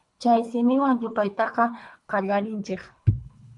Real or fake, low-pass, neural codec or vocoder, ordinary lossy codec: fake; 10.8 kHz; codec, 24 kHz, 3 kbps, HILCodec; AAC, 64 kbps